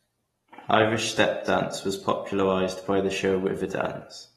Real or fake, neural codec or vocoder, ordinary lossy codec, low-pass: real; none; AAC, 32 kbps; 19.8 kHz